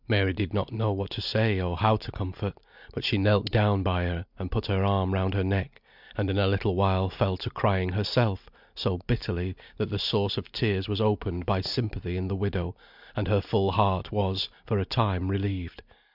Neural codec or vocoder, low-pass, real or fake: none; 5.4 kHz; real